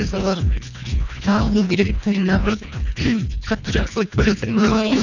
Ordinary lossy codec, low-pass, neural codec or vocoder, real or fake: none; 7.2 kHz; codec, 24 kHz, 1.5 kbps, HILCodec; fake